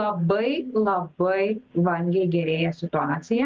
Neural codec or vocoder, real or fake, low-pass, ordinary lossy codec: none; real; 7.2 kHz; Opus, 24 kbps